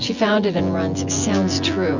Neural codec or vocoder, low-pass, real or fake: vocoder, 24 kHz, 100 mel bands, Vocos; 7.2 kHz; fake